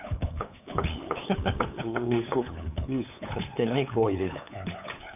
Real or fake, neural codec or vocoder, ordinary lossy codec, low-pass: fake; codec, 16 kHz, 8 kbps, FunCodec, trained on LibriTTS, 25 frames a second; none; 3.6 kHz